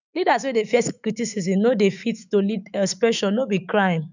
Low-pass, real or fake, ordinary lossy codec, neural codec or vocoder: 7.2 kHz; fake; none; autoencoder, 48 kHz, 128 numbers a frame, DAC-VAE, trained on Japanese speech